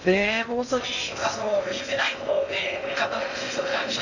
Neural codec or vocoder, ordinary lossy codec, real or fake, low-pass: codec, 16 kHz in and 24 kHz out, 0.6 kbps, FocalCodec, streaming, 2048 codes; none; fake; 7.2 kHz